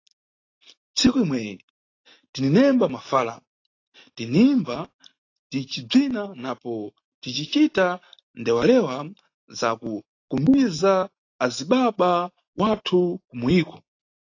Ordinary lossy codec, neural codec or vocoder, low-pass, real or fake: AAC, 32 kbps; none; 7.2 kHz; real